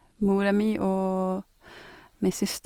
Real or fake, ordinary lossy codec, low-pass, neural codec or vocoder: real; Opus, 24 kbps; 19.8 kHz; none